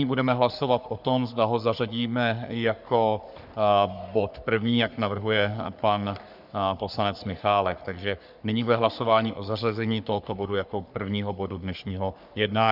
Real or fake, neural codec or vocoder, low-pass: fake; codec, 44.1 kHz, 3.4 kbps, Pupu-Codec; 5.4 kHz